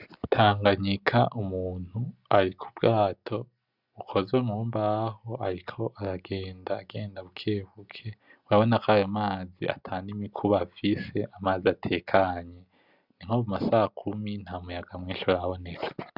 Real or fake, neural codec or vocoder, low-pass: real; none; 5.4 kHz